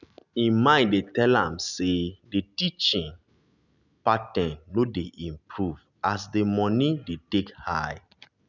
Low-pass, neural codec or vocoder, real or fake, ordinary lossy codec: 7.2 kHz; none; real; none